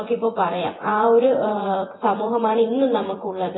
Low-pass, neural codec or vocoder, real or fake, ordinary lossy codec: 7.2 kHz; vocoder, 24 kHz, 100 mel bands, Vocos; fake; AAC, 16 kbps